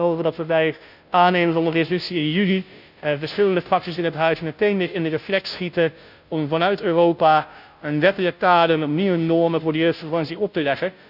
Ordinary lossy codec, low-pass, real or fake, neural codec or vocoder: none; 5.4 kHz; fake; codec, 16 kHz, 0.5 kbps, FunCodec, trained on Chinese and English, 25 frames a second